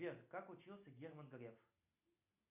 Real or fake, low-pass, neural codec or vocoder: real; 3.6 kHz; none